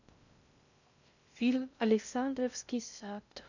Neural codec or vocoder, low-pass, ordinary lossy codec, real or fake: codec, 16 kHz in and 24 kHz out, 0.6 kbps, FocalCodec, streaming, 2048 codes; 7.2 kHz; none; fake